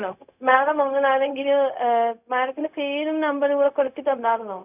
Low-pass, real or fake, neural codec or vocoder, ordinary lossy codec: 3.6 kHz; fake; codec, 16 kHz, 0.4 kbps, LongCat-Audio-Codec; none